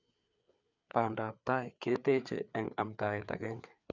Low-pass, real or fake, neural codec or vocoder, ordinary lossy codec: 7.2 kHz; fake; codec, 16 kHz, 4 kbps, FreqCodec, larger model; none